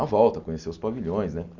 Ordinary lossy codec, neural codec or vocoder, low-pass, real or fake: AAC, 48 kbps; none; 7.2 kHz; real